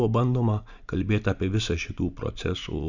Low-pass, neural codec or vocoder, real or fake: 7.2 kHz; none; real